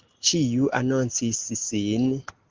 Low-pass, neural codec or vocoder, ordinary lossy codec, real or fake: 7.2 kHz; none; Opus, 16 kbps; real